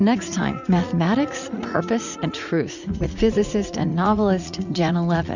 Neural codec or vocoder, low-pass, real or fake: vocoder, 44.1 kHz, 80 mel bands, Vocos; 7.2 kHz; fake